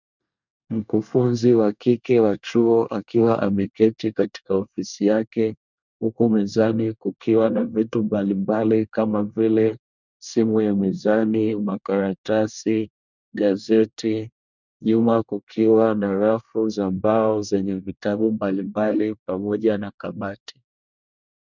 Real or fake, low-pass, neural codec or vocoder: fake; 7.2 kHz; codec, 24 kHz, 1 kbps, SNAC